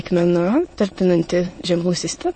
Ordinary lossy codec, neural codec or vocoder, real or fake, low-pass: MP3, 32 kbps; autoencoder, 22.05 kHz, a latent of 192 numbers a frame, VITS, trained on many speakers; fake; 9.9 kHz